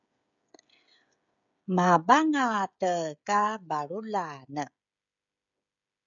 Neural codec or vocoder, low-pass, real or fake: codec, 16 kHz, 16 kbps, FreqCodec, smaller model; 7.2 kHz; fake